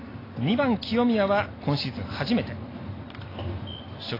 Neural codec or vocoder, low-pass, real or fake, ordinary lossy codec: none; 5.4 kHz; real; AAC, 24 kbps